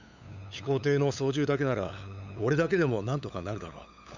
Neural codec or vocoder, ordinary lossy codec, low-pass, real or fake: codec, 16 kHz, 8 kbps, FunCodec, trained on LibriTTS, 25 frames a second; none; 7.2 kHz; fake